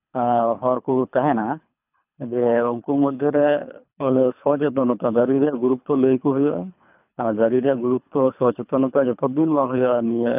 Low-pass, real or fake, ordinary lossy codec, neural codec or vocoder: 3.6 kHz; fake; none; codec, 24 kHz, 3 kbps, HILCodec